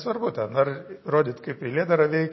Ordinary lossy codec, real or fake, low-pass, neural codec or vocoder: MP3, 24 kbps; real; 7.2 kHz; none